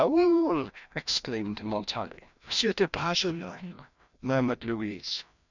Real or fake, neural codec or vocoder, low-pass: fake; codec, 16 kHz, 1 kbps, FreqCodec, larger model; 7.2 kHz